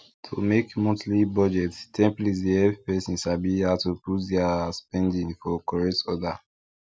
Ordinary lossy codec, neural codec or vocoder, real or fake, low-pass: none; none; real; none